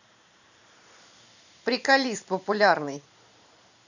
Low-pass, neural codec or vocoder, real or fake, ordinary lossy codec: 7.2 kHz; vocoder, 44.1 kHz, 128 mel bands every 512 samples, BigVGAN v2; fake; none